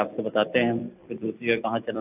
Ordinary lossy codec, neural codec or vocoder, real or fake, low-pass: AAC, 32 kbps; vocoder, 44.1 kHz, 128 mel bands every 256 samples, BigVGAN v2; fake; 3.6 kHz